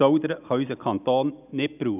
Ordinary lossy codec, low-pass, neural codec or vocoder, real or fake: none; 3.6 kHz; none; real